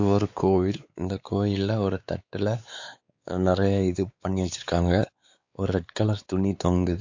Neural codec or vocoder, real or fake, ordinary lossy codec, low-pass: codec, 16 kHz, 4 kbps, X-Codec, WavLM features, trained on Multilingual LibriSpeech; fake; AAC, 48 kbps; 7.2 kHz